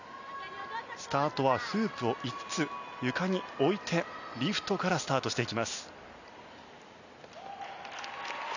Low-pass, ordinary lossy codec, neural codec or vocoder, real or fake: 7.2 kHz; none; none; real